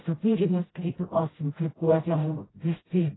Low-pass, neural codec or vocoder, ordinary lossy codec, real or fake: 7.2 kHz; codec, 16 kHz, 0.5 kbps, FreqCodec, smaller model; AAC, 16 kbps; fake